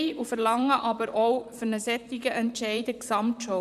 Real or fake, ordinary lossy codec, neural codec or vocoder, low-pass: fake; none; vocoder, 44.1 kHz, 128 mel bands, Pupu-Vocoder; 14.4 kHz